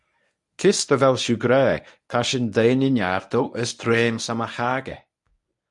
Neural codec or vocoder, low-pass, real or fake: codec, 24 kHz, 0.9 kbps, WavTokenizer, medium speech release version 1; 10.8 kHz; fake